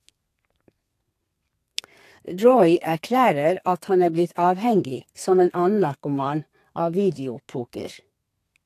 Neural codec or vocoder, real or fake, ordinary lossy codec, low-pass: codec, 32 kHz, 1.9 kbps, SNAC; fake; AAC, 64 kbps; 14.4 kHz